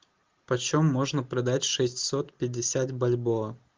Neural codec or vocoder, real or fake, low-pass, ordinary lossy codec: none; real; 7.2 kHz; Opus, 32 kbps